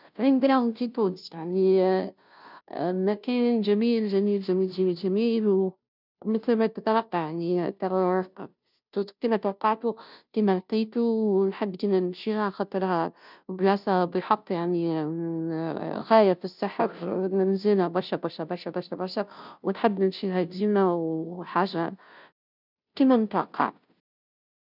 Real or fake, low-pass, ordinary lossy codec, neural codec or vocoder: fake; 5.4 kHz; none; codec, 16 kHz, 0.5 kbps, FunCodec, trained on Chinese and English, 25 frames a second